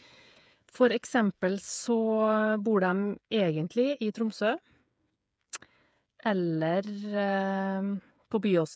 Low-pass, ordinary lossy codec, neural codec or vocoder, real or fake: none; none; codec, 16 kHz, 8 kbps, FreqCodec, smaller model; fake